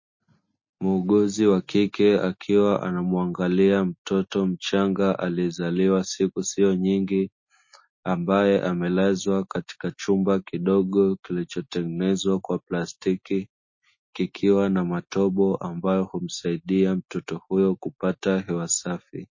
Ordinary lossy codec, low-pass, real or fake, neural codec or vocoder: MP3, 32 kbps; 7.2 kHz; real; none